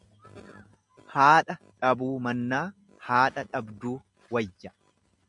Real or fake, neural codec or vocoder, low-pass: real; none; 10.8 kHz